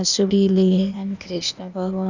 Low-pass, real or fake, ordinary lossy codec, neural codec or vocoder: 7.2 kHz; fake; none; codec, 16 kHz, 0.8 kbps, ZipCodec